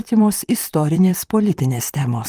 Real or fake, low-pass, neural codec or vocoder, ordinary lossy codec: fake; 14.4 kHz; vocoder, 44.1 kHz, 128 mel bands, Pupu-Vocoder; Opus, 32 kbps